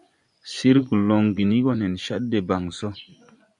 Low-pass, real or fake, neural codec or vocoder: 10.8 kHz; fake; vocoder, 24 kHz, 100 mel bands, Vocos